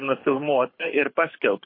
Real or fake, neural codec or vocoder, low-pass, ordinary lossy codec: fake; codec, 24 kHz, 6 kbps, HILCodec; 5.4 kHz; MP3, 24 kbps